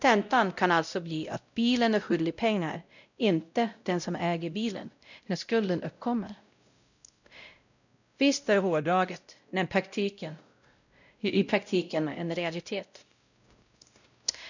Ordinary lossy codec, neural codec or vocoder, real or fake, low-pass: none; codec, 16 kHz, 0.5 kbps, X-Codec, WavLM features, trained on Multilingual LibriSpeech; fake; 7.2 kHz